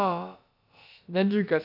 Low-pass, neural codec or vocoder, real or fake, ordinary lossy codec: 5.4 kHz; codec, 16 kHz, about 1 kbps, DyCAST, with the encoder's durations; fake; none